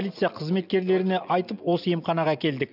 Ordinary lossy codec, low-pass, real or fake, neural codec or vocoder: none; 5.4 kHz; real; none